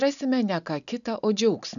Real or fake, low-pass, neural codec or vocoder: real; 7.2 kHz; none